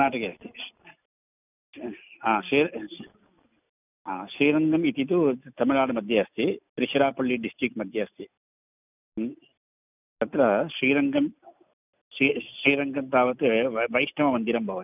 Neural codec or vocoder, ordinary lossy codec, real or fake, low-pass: none; none; real; 3.6 kHz